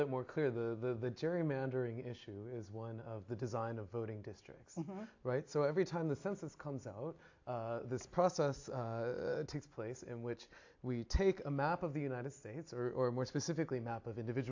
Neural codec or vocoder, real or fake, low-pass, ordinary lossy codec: none; real; 7.2 kHz; AAC, 48 kbps